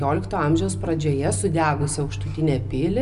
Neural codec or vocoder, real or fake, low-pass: none; real; 10.8 kHz